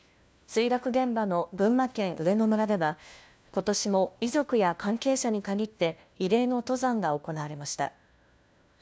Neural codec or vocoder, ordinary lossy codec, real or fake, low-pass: codec, 16 kHz, 1 kbps, FunCodec, trained on LibriTTS, 50 frames a second; none; fake; none